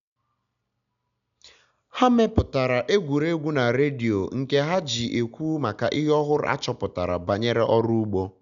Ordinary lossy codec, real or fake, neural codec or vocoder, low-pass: none; real; none; 7.2 kHz